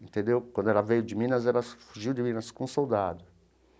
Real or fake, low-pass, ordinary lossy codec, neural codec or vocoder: real; none; none; none